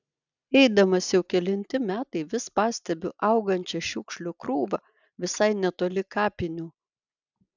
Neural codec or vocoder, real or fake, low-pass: none; real; 7.2 kHz